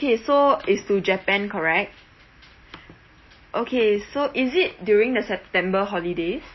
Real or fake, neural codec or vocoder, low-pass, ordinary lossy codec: real; none; 7.2 kHz; MP3, 24 kbps